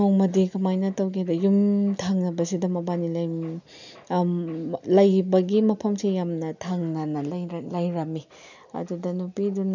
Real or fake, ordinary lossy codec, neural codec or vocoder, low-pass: real; none; none; 7.2 kHz